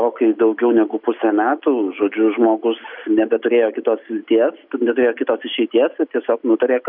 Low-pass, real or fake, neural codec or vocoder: 5.4 kHz; real; none